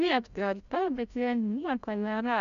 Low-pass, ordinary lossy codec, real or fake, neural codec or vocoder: 7.2 kHz; MP3, 64 kbps; fake; codec, 16 kHz, 0.5 kbps, FreqCodec, larger model